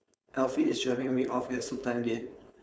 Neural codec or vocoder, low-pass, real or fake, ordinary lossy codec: codec, 16 kHz, 4.8 kbps, FACodec; none; fake; none